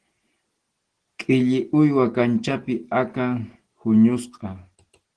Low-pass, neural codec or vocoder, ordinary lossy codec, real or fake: 9.9 kHz; none; Opus, 16 kbps; real